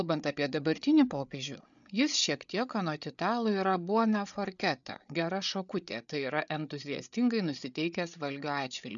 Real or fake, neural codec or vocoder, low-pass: fake; codec, 16 kHz, 8 kbps, FreqCodec, larger model; 7.2 kHz